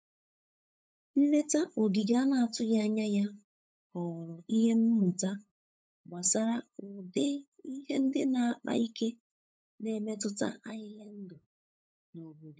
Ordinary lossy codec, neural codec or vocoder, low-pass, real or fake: none; codec, 16 kHz, 8 kbps, FunCodec, trained on LibriTTS, 25 frames a second; none; fake